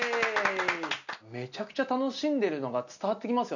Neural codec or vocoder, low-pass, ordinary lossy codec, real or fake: none; 7.2 kHz; none; real